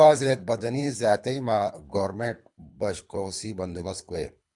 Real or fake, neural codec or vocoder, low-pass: fake; codec, 24 kHz, 3 kbps, HILCodec; 10.8 kHz